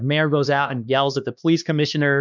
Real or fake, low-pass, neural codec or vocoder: fake; 7.2 kHz; codec, 16 kHz, 2 kbps, X-Codec, HuBERT features, trained on LibriSpeech